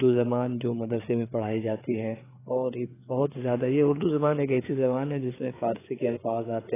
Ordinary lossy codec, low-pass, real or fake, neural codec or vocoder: AAC, 16 kbps; 3.6 kHz; fake; codec, 16 kHz, 4 kbps, FreqCodec, larger model